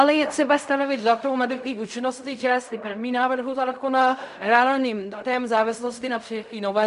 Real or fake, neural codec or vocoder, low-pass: fake; codec, 16 kHz in and 24 kHz out, 0.4 kbps, LongCat-Audio-Codec, fine tuned four codebook decoder; 10.8 kHz